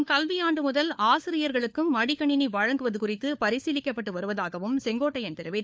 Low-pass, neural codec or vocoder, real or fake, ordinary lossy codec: none; codec, 16 kHz, 4 kbps, FunCodec, trained on LibriTTS, 50 frames a second; fake; none